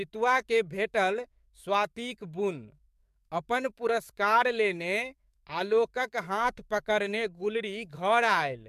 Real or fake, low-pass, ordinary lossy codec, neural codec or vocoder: fake; 14.4 kHz; MP3, 96 kbps; codec, 44.1 kHz, 7.8 kbps, DAC